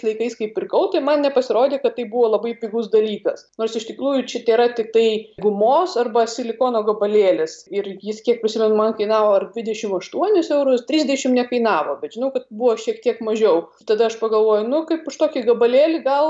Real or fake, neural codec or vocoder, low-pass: real; none; 9.9 kHz